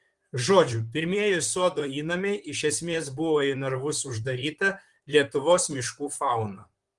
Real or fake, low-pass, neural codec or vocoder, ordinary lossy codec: fake; 10.8 kHz; vocoder, 44.1 kHz, 128 mel bands, Pupu-Vocoder; Opus, 24 kbps